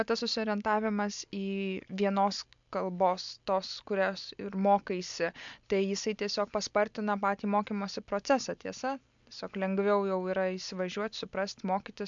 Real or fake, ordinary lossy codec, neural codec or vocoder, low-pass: real; MP3, 64 kbps; none; 7.2 kHz